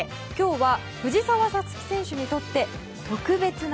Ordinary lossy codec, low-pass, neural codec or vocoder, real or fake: none; none; none; real